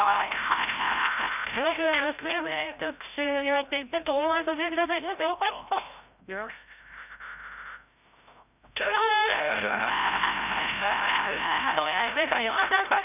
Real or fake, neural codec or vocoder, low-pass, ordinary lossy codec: fake; codec, 16 kHz, 0.5 kbps, FreqCodec, larger model; 3.6 kHz; none